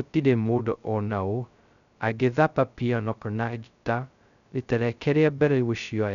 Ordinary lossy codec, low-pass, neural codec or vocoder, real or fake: none; 7.2 kHz; codec, 16 kHz, 0.2 kbps, FocalCodec; fake